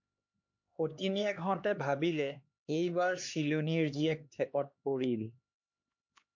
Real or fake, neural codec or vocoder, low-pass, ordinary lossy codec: fake; codec, 16 kHz, 2 kbps, X-Codec, HuBERT features, trained on LibriSpeech; 7.2 kHz; MP3, 48 kbps